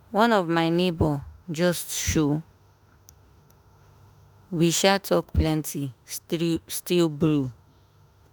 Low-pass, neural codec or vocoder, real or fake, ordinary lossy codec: none; autoencoder, 48 kHz, 32 numbers a frame, DAC-VAE, trained on Japanese speech; fake; none